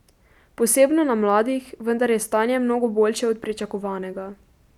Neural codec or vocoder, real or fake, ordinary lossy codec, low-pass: none; real; none; 19.8 kHz